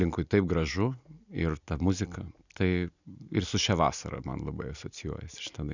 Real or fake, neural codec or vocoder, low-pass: real; none; 7.2 kHz